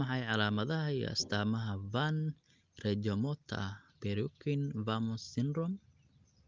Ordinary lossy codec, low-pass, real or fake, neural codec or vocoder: Opus, 24 kbps; 7.2 kHz; real; none